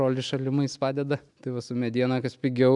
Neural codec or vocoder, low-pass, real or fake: none; 10.8 kHz; real